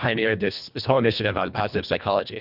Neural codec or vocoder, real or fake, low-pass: codec, 24 kHz, 1.5 kbps, HILCodec; fake; 5.4 kHz